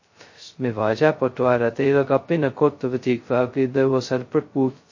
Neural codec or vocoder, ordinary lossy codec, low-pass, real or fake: codec, 16 kHz, 0.2 kbps, FocalCodec; MP3, 32 kbps; 7.2 kHz; fake